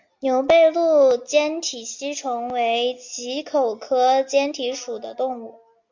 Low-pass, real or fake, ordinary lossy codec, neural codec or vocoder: 7.2 kHz; real; MP3, 64 kbps; none